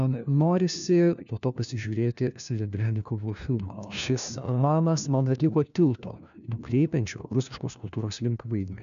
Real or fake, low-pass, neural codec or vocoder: fake; 7.2 kHz; codec, 16 kHz, 1 kbps, FunCodec, trained on LibriTTS, 50 frames a second